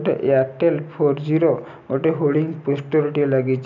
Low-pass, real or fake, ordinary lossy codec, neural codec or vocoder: 7.2 kHz; real; none; none